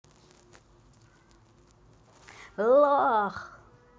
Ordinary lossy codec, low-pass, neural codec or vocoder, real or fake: none; none; none; real